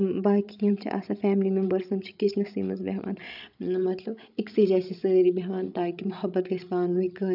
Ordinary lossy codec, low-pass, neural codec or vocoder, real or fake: none; 5.4 kHz; codec, 16 kHz, 16 kbps, FreqCodec, larger model; fake